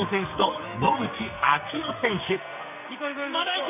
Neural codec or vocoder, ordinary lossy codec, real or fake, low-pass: codec, 44.1 kHz, 2.6 kbps, SNAC; none; fake; 3.6 kHz